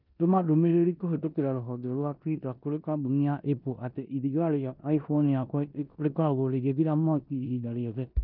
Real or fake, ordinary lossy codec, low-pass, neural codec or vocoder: fake; none; 5.4 kHz; codec, 16 kHz in and 24 kHz out, 0.9 kbps, LongCat-Audio-Codec, four codebook decoder